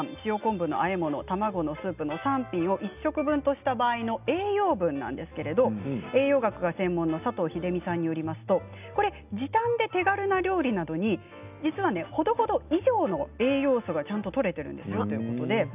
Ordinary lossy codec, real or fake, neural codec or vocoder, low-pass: none; real; none; 3.6 kHz